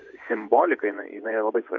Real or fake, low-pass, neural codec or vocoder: fake; 7.2 kHz; vocoder, 24 kHz, 100 mel bands, Vocos